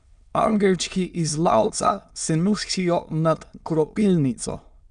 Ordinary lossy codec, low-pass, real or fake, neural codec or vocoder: none; 9.9 kHz; fake; autoencoder, 22.05 kHz, a latent of 192 numbers a frame, VITS, trained on many speakers